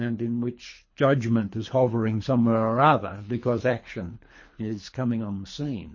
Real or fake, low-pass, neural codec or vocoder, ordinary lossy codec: fake; 7.2 kHz; codec, 24 kHz, 3 kbps, HILCodec; MP3, 32 kbps